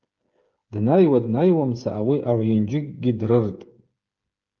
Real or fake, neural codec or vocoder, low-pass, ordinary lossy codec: fake; codec, 16 kHz, 8 kbps, FreqCodec, smaller model; 7.2 kHz; Opus, 24 kbps